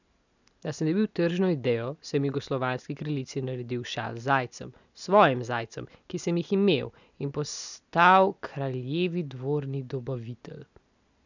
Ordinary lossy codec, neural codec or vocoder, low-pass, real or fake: none; none; 7.2 kHz; real